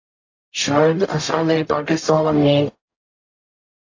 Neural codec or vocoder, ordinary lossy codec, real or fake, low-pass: codec, 44.1 kHz, 0.9 kbps, DAC; AAC, 48 kbps; fake; 7.2 kHz